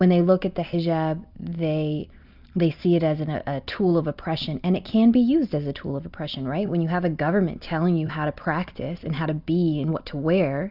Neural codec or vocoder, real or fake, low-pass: none; real; 5.4 kHz